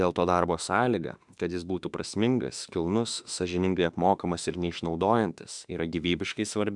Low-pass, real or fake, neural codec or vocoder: 10.8 kHz; fake; autoencoder, 48 kHz, 32 numbers a frame, DAC-VAE, trained on Japanese speech